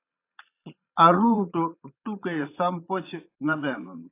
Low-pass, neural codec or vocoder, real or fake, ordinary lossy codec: 3.6 kHz; vocoder, 22.05 kHz, 80 mel bands, Vocos; fake; AAC, 24 kbps